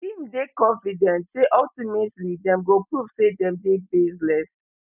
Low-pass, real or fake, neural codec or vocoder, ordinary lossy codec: 3.6 kHz; real; none; none